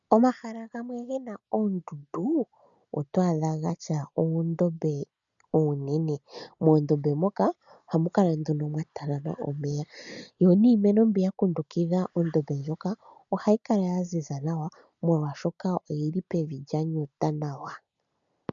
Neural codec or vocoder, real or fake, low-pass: none; real; 7.2 kHz